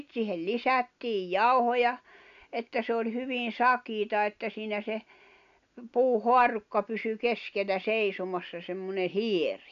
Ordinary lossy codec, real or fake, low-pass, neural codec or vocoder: none; real; 7.2 kHz; none